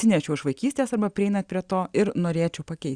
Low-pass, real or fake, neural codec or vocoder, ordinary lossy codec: 9.9 kHz; real; none; Opus, 64 kbps